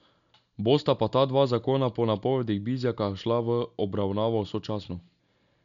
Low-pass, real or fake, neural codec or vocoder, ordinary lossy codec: 7.2 kHz; real; none; none